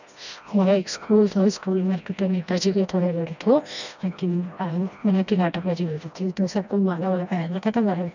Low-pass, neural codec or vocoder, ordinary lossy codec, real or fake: 7.2 kHz; codec, 16 kHz, 1 kbps, FreqCodec, smaller model; none; fake